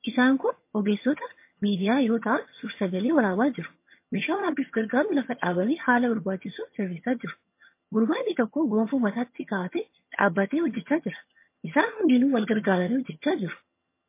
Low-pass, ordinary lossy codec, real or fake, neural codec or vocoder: 3.6 kHz; MP3, 24 kbps; fake; vocoder, 22.05 kHz, 80 mel bands, HiFi-GAN